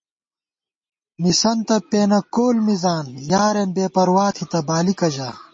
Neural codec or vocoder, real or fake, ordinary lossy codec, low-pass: none; real; MP3, 32 kbps; 9.9 kHz